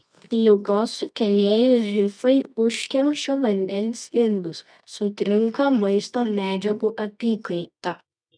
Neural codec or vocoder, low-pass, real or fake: codec, 24 kHz, 0.9 kbps, WavTokenizer, medium music audio release; 9.9 kHz; fake